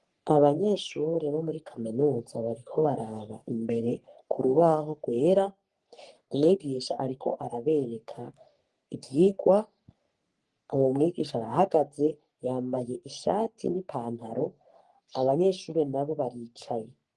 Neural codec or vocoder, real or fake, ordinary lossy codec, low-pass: codec, 44.1 kHz, 3.4 kbps, Pupu-Codec; fake; Opus, 24 kbps; 10.8 kHz